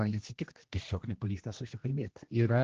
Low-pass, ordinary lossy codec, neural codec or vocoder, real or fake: 7.2 kHz; Opus, 16 kbps; codec, 16 kHz, 1 kbps, X-Codec, HuBERT features, trained on general audio; fake